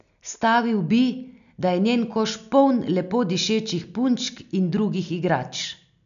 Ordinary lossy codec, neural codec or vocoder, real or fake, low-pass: none; none; real; 7.2 kHz